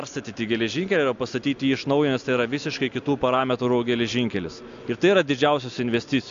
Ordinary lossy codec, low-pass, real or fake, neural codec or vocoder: AAC, 64 kbps; 7.2 kHz; real; none